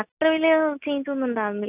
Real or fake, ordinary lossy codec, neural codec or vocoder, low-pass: real; none; none; 3.6 kHz